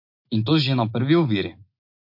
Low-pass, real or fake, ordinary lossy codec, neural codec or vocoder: 5.4 kHz; real; MP3, 32 kbps; none